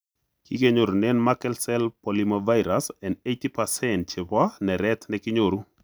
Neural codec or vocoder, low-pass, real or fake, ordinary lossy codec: none; none; real; none